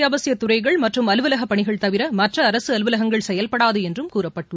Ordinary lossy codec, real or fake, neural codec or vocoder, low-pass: none; real; none; none